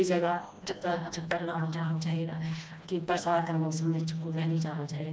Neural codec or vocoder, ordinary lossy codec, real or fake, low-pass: codec, 16 kHz, 1 kbps, FreqCodec, smaller model; none; fake; none